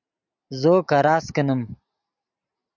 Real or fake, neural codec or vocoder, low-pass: real; none; 7.2 kHz